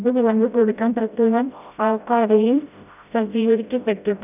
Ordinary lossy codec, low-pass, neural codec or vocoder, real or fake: none; 3.6 kHz; codec, 16 kHz, 0.5 kbps, FreqCodec, smaller model; fake